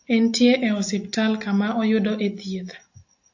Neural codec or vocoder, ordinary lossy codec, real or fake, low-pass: none; AAC, 48 kbps; real; 7.2 kHz